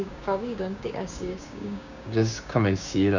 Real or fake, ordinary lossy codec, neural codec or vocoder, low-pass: fake; none; codec, 16 kHz, 6 kbps, DAC; 7.2 kHz